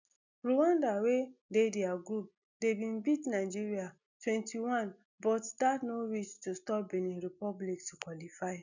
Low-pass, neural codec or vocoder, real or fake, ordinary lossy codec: 7.2 kHz; none; real; none